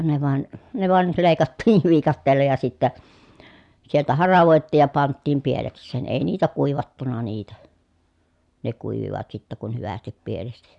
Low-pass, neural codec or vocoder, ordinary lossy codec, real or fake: 10.8 kHz; none; none; real